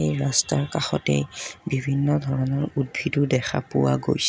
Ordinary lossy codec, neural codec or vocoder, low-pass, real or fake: none; none; none; real